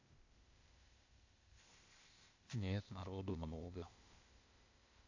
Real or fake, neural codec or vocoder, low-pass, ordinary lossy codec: fake; codec, 16 kHz, 0.8 kbps, ZipCodec; 7.2 kHz; AAC, 48 kbps